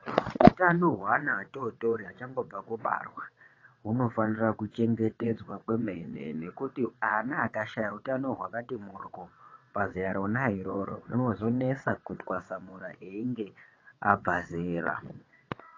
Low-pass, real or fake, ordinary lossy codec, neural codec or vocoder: 7.2 kHz; fake; AAC, 32 kbps; vocoder, 22.05 kHz, 80 mel bands, WaveNeXt